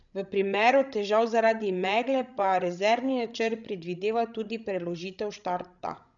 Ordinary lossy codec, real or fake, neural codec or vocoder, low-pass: none; fake; codec, 16 kHz, 16 kbps, FreqCodec, larger model; 7.2 kHz